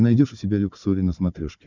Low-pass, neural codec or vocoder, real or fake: 7.2 kHz; codec, 16 kHz, 16 kbps, FunCodec, trained on Chinese and English, 50 frames a second; fake